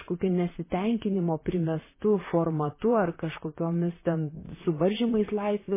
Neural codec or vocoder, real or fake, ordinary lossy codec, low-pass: vocoder, 44.1 kHz, 128 mel bands, Pupu-Vocoder; fake; MP3, 16 kbps; 3.6 kHz